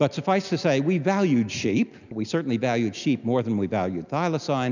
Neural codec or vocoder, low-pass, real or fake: none; 7.2 kHz; real